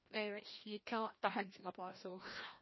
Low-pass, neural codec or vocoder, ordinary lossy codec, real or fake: 7.2 kHz; codec, 16 kHz, 1 kbps, FreqCodec, larger model; MP3, 24 kbps; fake